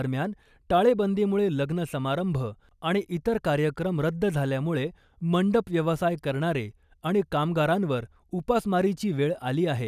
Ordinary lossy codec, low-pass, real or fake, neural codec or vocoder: none; 14.4 kHz; real; none